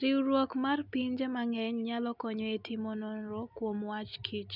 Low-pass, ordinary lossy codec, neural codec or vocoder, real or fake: 5.4 kHz; none; none; real